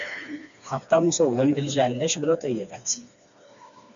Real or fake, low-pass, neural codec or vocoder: fake; 7.2 kHz; codec, 16 kHz, 2 kbps, FreqCodec, smaller model